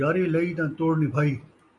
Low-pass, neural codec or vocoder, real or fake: 10.8 kHz; none; real